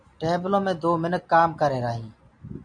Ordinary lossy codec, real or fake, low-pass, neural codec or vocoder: MP3, 64 kbps; real; 9.9 kHz; none